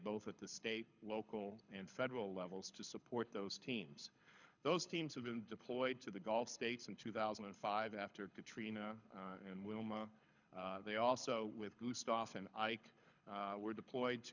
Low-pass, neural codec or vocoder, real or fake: 7.2 kHz; codec, 24 kHz, 6 kbps, HILCodec; fake